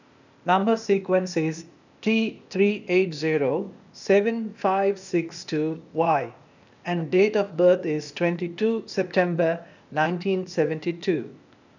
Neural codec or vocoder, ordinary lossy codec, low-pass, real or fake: codec, 16 kHz, 0.8 kbps, ZipCodec; none; 7.2 kHz; fake